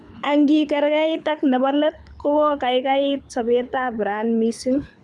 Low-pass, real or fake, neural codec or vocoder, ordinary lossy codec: none; fake; codec, 24 kHz, 6 kbps, HILCodec; none